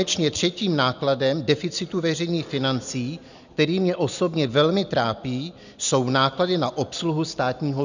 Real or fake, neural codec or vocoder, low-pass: real; none; 7.2 kHz